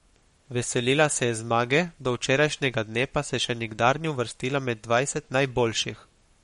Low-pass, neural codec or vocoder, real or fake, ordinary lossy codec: 19.8 kHz; codec, 44.1 kHz, 7.8 kbps, Pupu-Codec; fake; MP3, 48 kbps